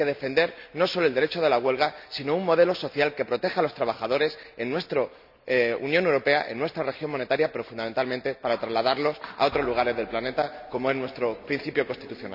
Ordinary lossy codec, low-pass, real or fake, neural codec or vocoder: none; 5.4 kHz; real; none